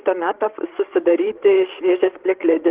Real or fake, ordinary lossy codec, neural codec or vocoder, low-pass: fake; Opus, 16 kbps; codec, 16 kHz, 16 kbps, FreqCodec, larger model; 3.6 kHz